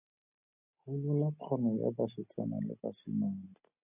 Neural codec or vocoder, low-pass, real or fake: none; 3.6 kHz; real